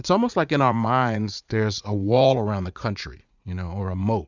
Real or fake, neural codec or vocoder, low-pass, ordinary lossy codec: fake; vocoder, 44.1 kHz, 80 mel bands, Vocos; 7.2 kHz; Opus, 64 kbps